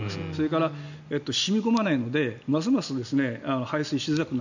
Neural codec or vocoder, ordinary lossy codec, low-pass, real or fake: none; none; 7.2 kHz; real